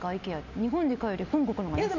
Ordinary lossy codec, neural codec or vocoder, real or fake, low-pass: none; none; real; 7.2 kHz